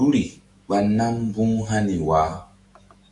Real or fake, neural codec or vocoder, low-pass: fake; autoencoder, 48 kHz, 128 numbers a frame, DAC-VAE, trained on Japanese speech; 10.8 kHz